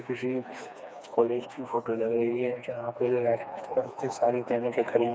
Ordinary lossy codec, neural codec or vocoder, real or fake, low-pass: none; codec, 16 kHz, 2 kbps, FreqCodec, smaller model; fake; none